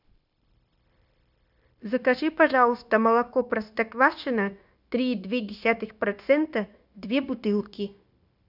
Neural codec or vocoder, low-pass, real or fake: codec, 16 kHz, 0.9 kbps, LongCat-Audio-Codec; 5.4 kHz; fake